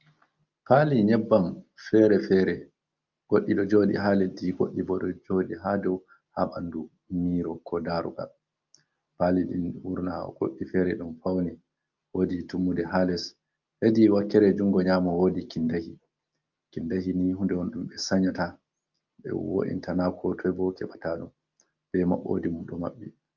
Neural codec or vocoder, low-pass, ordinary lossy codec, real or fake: none; 7.2 kHz; Opus, 24 kbps; real